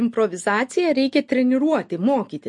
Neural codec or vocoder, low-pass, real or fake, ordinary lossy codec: none; 10.8 kHz; real; MP3, 48 kbps